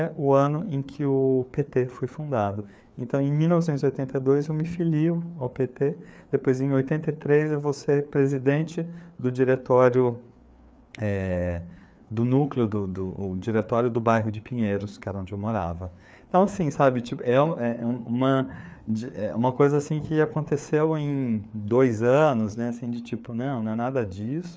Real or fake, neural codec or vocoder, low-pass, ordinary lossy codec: fake; codec, 16 kHz, 4 kbps, FreqCodec, larger model; none; none